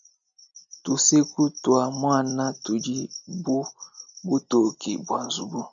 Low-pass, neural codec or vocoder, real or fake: 7.2 kHz; none; real